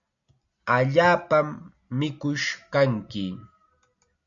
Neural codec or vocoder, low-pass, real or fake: none; 7.2 kHz; real